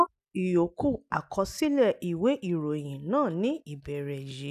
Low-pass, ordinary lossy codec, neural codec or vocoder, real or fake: 14.4 kHz; none; none; real